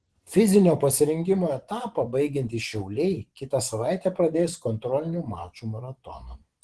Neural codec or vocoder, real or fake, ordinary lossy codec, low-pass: vocoder, 24 kHz, 100 mel bands, Vocos; fake; Opus, 16 kbps; 10.8 kHz